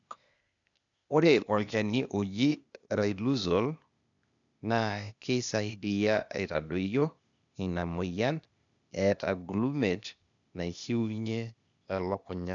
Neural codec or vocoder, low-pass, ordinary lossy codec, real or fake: codec, 16 kHz, 0.8 kbps, ZipCodec; 7.2 kHz; none; fake